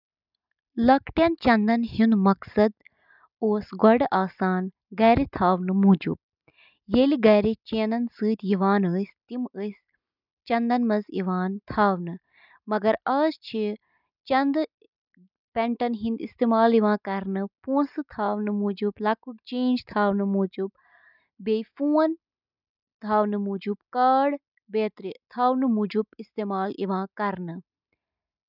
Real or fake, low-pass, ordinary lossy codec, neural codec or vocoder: real; 5.4 kHz; none; none